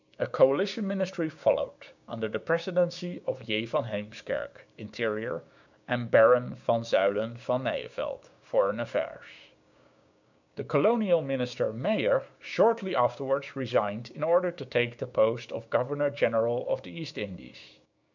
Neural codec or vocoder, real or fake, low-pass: codec, 16 kHz, 6 kbps, DAC; fake; 7.2 kHz